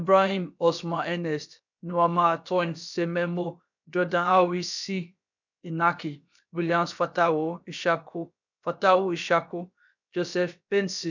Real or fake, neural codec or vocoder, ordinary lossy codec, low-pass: fake; codec, 16 kHz, about 1 kbps, DyCAST, with the encoder's durations; none; 7.2 kHz